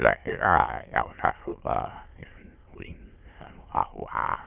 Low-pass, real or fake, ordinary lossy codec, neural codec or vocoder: 3.6 kHz; fake; Opus, 32 kbps; autoencoder, 22.05 kHz, a latent of 192 numbers a frame, VITS, trained on many speakers